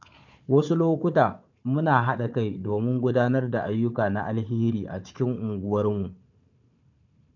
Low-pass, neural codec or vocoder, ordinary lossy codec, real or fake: 7.2 kHz; codec, 16 kHz, 4 kbps, FunCodec, trained on Chinese and English, 50 frames a second; none; fake